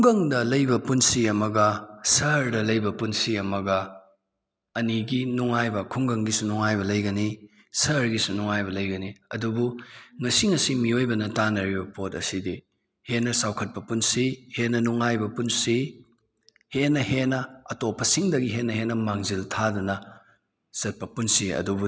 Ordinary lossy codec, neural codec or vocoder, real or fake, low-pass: none; none; real; none